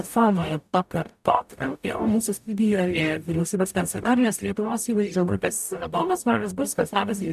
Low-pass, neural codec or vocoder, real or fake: 14.4 kHz; codec, 44.1 kHz, 0.9 kbps, DAC; fake